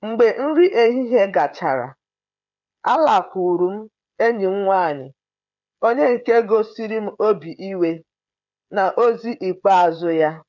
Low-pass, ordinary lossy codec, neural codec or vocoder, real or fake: 7.2 kHz; none; codec, 16 kHz, 16 kbps, FreqCodec, smaller model; fake